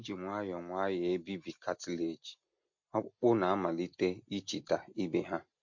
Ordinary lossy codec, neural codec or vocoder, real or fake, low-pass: MP3, 48 kbps; none; real; 7.2 kHz